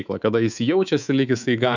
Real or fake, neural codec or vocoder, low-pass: fake; codec, 24 kHz, 3.1 kbps, DualCodec; 7.2 kHz